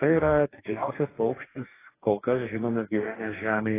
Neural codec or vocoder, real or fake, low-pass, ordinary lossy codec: codec, 44.1 kHz, 2.6 kbps, DAC; fake; 3.6 kHz; AAC, 16 kbps